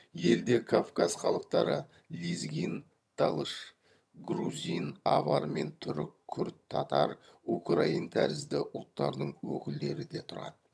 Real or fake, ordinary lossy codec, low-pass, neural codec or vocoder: fake; none; none; vocoder, 22.05 kHz, 80 mel bands, HiFi-GAN